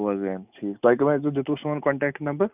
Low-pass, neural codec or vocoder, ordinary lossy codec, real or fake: 3.6 kHz; none; none; real